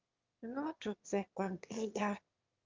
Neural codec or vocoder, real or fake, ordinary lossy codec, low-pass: autoencoder, 22.05 kHz, a latent of 192 numbers a frame, VITS, trained on one speaker; fake; Opus, 32 kbps; 7.2 kHz